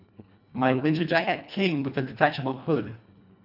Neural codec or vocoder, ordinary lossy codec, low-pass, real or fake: codec, 24 kHz, 1.5 kbps, HILCodec; none; 5.4 kHz; fake